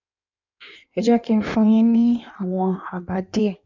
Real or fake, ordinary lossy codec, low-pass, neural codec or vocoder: fake; none; 7.2 kHz; codec, 16 kHz in and 24 kHz out, 1.1 kbps, FireRedTTS-2 codec